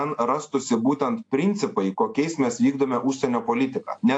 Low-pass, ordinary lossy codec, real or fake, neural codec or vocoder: 9.9 kHz; AAC, 48 kbps; real; none